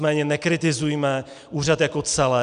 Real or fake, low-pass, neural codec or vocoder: real; 9.9 kHz; none